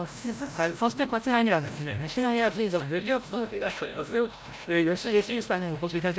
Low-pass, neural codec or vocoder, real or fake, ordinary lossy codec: none; codec, 16 kHz, 0.5 kbps, FreqCodec, larger model; fake; none